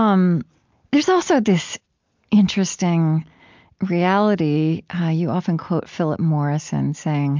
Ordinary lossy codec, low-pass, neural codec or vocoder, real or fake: MP3, 64 kbps; 7.2 kHz; none; real